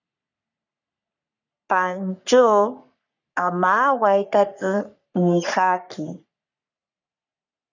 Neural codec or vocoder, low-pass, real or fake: codec, 44.1 kHz, 3.4 kbps, Pupu-Codec; 7.2 kHz; fake